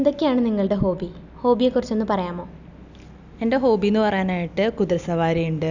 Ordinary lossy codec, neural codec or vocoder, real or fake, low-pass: none; none; real; 7.2 kHz